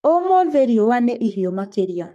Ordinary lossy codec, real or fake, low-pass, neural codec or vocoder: none; fake; 14.4 kHz; codec, 44.1 kHz, 3.4 kbps, Pupu-Codec